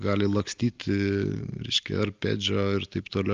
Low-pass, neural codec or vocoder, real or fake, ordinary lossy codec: 7.2 kHz; none; real; Opus, 24 kbps